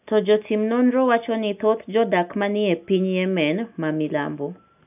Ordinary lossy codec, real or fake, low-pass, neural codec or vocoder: none; real; 3.6 kHz; none